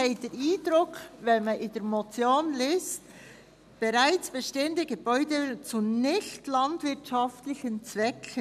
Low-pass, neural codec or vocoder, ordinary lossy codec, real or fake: 14.4 kHz; none; none; real